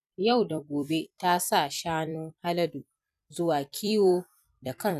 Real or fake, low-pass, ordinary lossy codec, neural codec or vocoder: fake; 14.4 kHz; none; vocoder, 44.1 kHz, 128 mel bands every 256 samples, BigVGAN v2